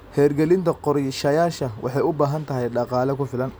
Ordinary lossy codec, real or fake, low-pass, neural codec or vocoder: none; real; none; none